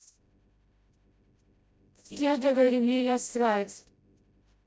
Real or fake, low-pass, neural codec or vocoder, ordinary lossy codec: fake; none; codec, 16 kHz, 0.5 kbps, FreqCodec, smaller model; none